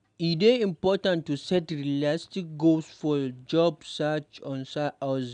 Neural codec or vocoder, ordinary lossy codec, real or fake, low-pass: none; none; real; 9.9 kHz